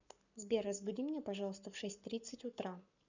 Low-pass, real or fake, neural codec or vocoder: 7.2 kHz; fake; codec, 44.1 kHz, 7.8 kbps, Pupu-Codec